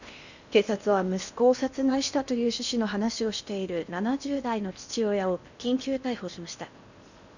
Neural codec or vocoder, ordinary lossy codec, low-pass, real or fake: codec, 16 kHz in and 24 kHz out, 0.8 kbps, FocalCodec, streaming, 65536 codes; none; 7.2 kHz; fake